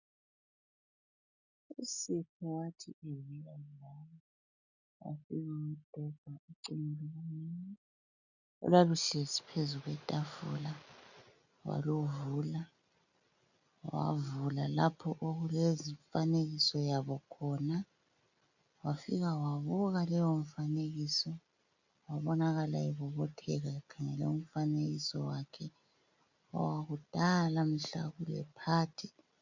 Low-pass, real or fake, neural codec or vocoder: 7.2 kHz; real; none